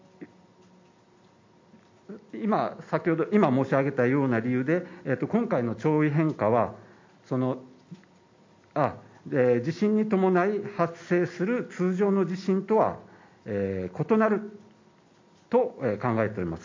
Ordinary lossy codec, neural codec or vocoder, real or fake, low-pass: MP3, 48 kbps; none; real; 7.2 kHz